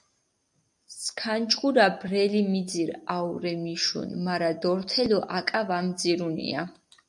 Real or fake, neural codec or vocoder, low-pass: real; none; 10.8 kHz